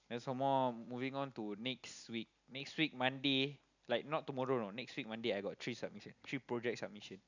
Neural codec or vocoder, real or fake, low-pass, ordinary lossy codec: none; real; 7.2 kHz; none